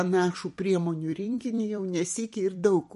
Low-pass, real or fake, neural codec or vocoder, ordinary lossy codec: 14.4 kHz; fake; vocoder, 44.1 kHz, 128 mel bands every 256 samples, BigVGAN v2; MP3, 48 kbps